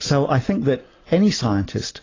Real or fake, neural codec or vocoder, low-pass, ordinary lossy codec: real; none; 7.2 kHz; AAC, 32 kbps